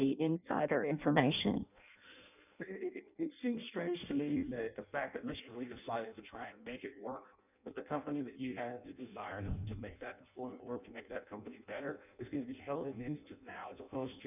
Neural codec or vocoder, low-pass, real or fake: codec, 16 kHz in and 24 kHz out, 0.6 kbps, FireRedTTS-2 codec; 3.6 kHz; fake